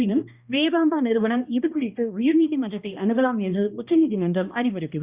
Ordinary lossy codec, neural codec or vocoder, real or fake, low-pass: Opus, 64 kbps; codec, 16 kHz, 1 kbps, X-Codec, HuBERT features, trained on balanced general audio; fake; 3.6 kHz